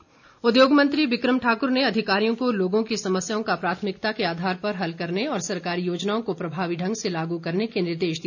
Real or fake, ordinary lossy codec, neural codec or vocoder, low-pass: real; none; none; 7.2 kHz